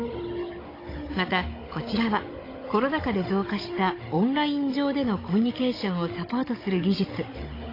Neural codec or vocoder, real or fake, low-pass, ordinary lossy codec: codec, 16 kHz, 16 kbps, FunCodec, trained on Chinese and English, 50 frames a second; fake; 5.4 kHz; AAC, 24 kbps